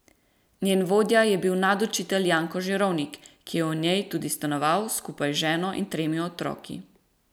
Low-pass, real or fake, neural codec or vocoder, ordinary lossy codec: none; real; none; none